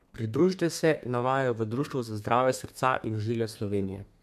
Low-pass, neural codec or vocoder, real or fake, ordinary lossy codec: 14.4 kHz; codec, 32 kHz, 1.9 kbps, SNAC; fake; MP3, 96 kbps